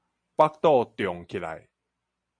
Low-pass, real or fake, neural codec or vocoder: 9.9 kHz; real; none